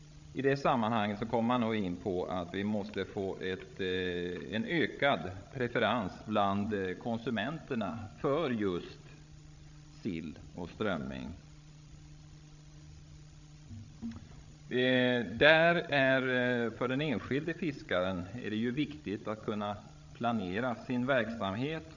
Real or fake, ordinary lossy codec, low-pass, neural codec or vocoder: fake; none; 7.2 kHz; codec, 16 kHz, 16 kbps, FreqCodec, larger model